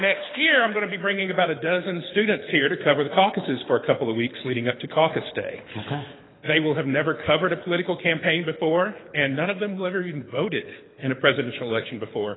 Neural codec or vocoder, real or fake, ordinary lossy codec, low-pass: codec, 24 kHz, 6 kbps, HILCodec; fake; AAC, 16 kbps; 7.2 kHz